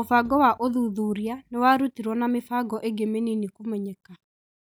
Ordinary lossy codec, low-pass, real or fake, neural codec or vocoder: none; none; real; none